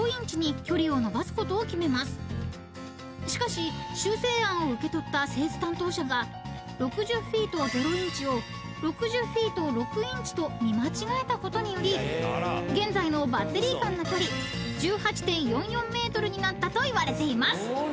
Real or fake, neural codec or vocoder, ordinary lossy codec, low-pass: real; none; none; none